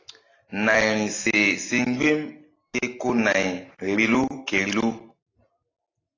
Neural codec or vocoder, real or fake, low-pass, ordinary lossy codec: none; real; 7.2 kHz; AAC, 32 kbps